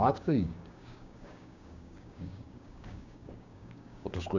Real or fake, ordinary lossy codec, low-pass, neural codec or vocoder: fake; none; 7.2 kHz; codec, 16 kHz, 6 kbps, DAC